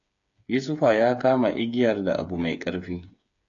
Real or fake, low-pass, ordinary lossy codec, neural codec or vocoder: fake; 7.2 kHz; AAC, 64 kbps; codec, 16 kHz, 8 kbps, FreqCodec, smaller model